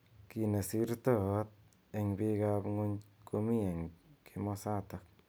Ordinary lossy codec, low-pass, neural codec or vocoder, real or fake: none; none; none; real